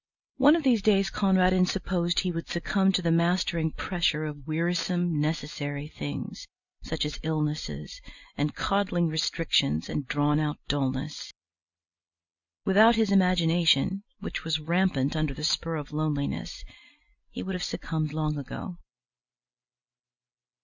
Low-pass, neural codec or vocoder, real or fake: 7.2 kHz; none; real